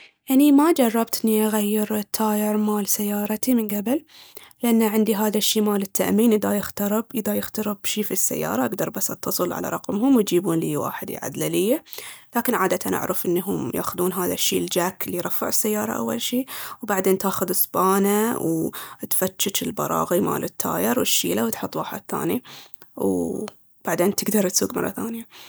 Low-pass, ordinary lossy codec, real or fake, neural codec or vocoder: none; none; real; none